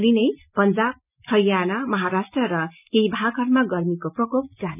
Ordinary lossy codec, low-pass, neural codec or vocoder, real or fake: none; 3.6 kHz; none; real